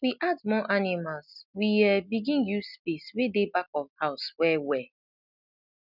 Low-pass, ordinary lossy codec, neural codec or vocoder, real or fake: 5.4 kHz; none; none; real